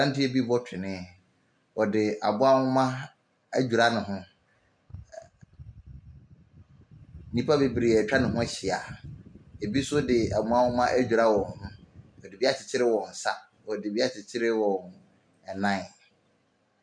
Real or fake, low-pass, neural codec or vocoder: real; 9.9 kHz; none